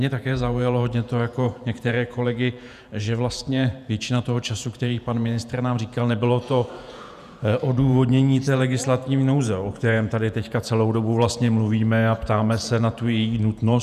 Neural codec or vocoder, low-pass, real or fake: vocoder, 48 kHz, 128 mel bands, Vocos; 14.4 kHz; fake